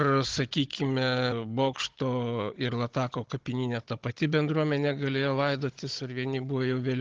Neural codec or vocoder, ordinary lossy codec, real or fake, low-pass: codec, 16 kHz, 16 kbps, FunCodec, trained on Chinese and English, 50 frames a second; Opus, 16 kbps; fake; 7.2 kHz